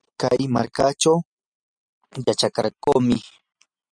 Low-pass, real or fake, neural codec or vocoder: 9.9 kHz; real; none